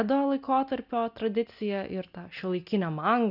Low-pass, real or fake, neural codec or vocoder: 5.4 kHz; real; none